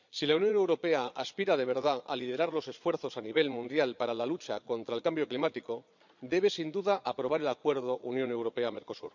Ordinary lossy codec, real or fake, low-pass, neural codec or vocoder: none; fake; 7.2 kHz; vocoder, 22.05 kHz, 80 mel bands, Vocos